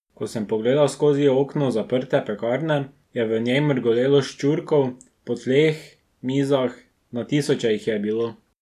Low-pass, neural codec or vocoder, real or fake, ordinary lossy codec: 14.4 kHz; none; real; none